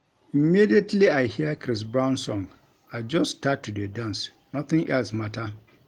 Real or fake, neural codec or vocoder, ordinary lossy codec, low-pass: real; none; Opus, 24 kbps; 19.8 kHz